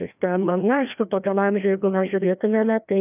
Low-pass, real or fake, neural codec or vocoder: 3.6 kHz; fake; codec, 16 kHz, 1 kbps, FreqCodec, larger model